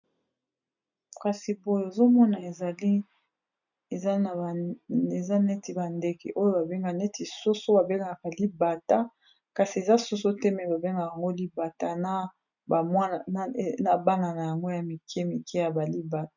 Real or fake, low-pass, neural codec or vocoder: real; 7.2 kHz; none